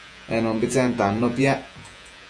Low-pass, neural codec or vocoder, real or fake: 9.9 kHz; vocoder, 48 kHz, 128 mel bands, Vocos; fake